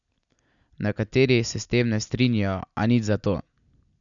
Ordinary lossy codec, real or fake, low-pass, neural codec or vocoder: none; real; 7.2 kHz; none